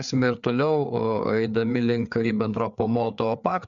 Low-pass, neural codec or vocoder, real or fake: 7.2 kHz; codec, 16 kHz, 4 kbps, FreqCodec, larger model; fake